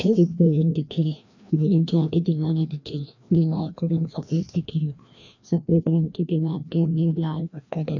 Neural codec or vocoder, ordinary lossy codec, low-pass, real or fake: codec, 16 kHz, 1 kbps, FreqCodec, larger model; none; 7.2 kHz; fake